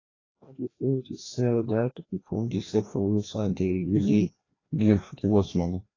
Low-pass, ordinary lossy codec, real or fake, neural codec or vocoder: 7.2 kHz; AAC, 32 kbps; fake; codec, 16 kHz, 1 kbps, FreqCodec, larger model